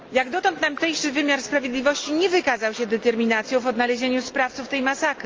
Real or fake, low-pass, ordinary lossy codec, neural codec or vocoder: real; 7.2 kHz; Opus, 24 kbps; none